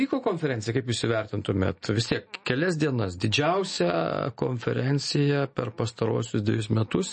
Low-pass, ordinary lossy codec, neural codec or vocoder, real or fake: 10.8 kHz; MP3, 32 kbps; none; real